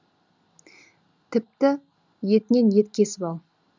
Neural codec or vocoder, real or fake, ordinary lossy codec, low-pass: none; real; none; 7.2 kHz